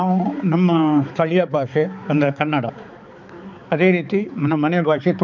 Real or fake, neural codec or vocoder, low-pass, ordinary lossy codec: fake; codec, 16 kHz, 4 kbps, X-Codec, HuBERT features, trained on balanced general audio; 7.2 kHz; none